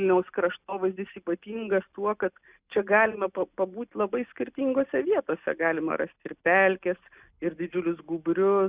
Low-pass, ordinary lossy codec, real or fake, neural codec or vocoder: 3.6 kHz; Opus, 64 kbps; real; none